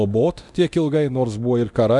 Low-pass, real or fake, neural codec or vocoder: 10.8 kHz; fake; codec, 24 kHz, 0.9 kbps, DualCodec